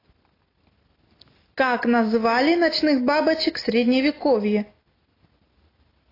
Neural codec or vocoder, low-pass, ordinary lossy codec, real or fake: none; 5.4 kHz; AAC, 24 kbps; real